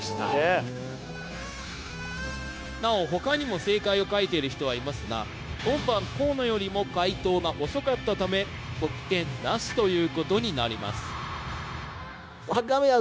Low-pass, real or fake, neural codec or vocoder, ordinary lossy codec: none; fake; codec, 16 kHz, 0.9 kbps, LongCat-Audio-Codec; none